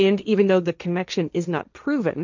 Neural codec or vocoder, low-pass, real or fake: codec, 16 kHz, 1.1 kbps, Voila-Tokenizer; 7.2 kHz; fake